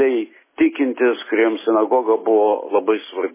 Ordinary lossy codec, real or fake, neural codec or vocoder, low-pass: MP3, 16 kbps; real; none; 3.6 kHz